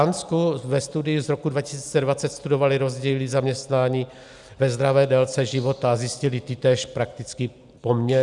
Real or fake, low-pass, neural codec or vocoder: real; 10.8 kHz; none